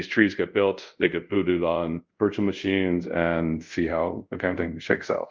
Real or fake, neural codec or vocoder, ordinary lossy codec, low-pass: fake; codec, 24 kHz, 0.5 kbps, DualCodec; Opus, 32 kbps; 7.2 kHz